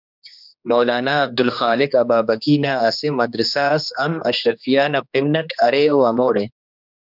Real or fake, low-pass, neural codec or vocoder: fake; 5.4 kHz; codec, 16 kHz, 2 kbps, X-Codec, HuBERT features, trained on general audio